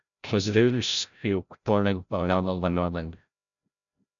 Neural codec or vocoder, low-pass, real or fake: codec, 16 kHz, 0.5 kbps, FreqCodec, larger model; 7.2 kHz; fake